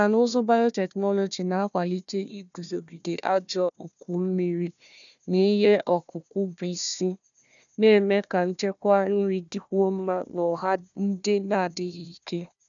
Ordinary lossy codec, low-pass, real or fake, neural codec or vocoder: none; 7.2 kHz; fake; codec, 16 kHz, 1 kbps, FunCodec, trained on Chinese and English, 50 frames a second